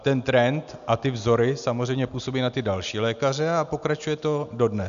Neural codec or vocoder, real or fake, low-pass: none; real; 7.2 kHz